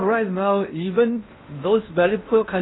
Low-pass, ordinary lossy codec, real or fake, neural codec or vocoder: 7.2 kHz; AAC, 16 kbps; fake; codec, 16 kHz in and 24 kHz out, 0.8 kbps, FocalCodec, streaming, 65536 codes